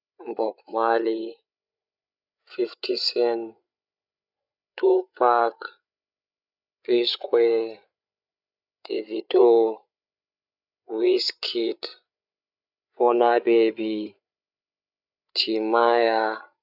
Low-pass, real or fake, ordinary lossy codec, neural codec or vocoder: 5.4 kHz; fake; none; codec, 16 kHz, 8 kbps, FreqCodec, larger model